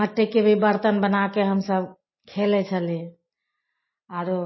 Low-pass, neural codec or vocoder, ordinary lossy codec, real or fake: 7.2 kHz; none; MP3, 24 kbps; real